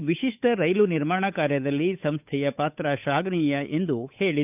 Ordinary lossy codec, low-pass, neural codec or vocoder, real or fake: none; 3.6 kHz; codec, 16 kHz, 8 kbps, FunCodec, trained on Chinese and English, 25 frames a second; fake